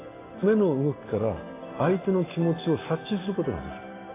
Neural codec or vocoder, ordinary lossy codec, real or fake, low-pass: none; AAC, 16 kbps; real; 3.6 kHz